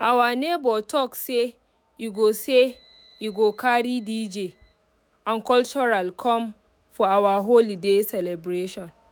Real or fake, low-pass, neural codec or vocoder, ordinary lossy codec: fake; none; autoencoder, 48 kHz, 128 numbers a frame, DAC-VAE, trained on Japanese speech; none